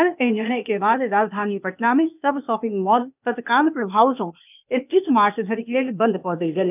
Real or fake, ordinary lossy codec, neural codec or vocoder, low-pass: fake; none; codec, 16 kHz, 0.8 kbps, ZipCodec; 3.6 kHz